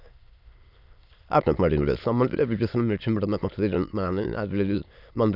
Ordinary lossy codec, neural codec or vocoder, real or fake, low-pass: none; autoencoder, 22.05 kHz, a latent of 192 numbers a frame, VITS, trained on many speakers; fake; 5.4 kHz